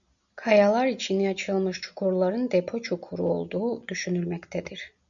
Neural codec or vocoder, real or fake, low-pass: none; real; 7.2 kHz